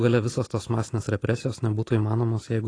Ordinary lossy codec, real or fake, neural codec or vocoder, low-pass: AAC, 32 kbps; real; none; 9.9 kHz